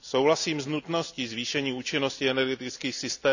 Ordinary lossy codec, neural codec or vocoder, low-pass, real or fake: none; none; 7.2 kHz; real